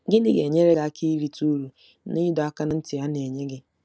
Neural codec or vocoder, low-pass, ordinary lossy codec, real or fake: none; none; none; real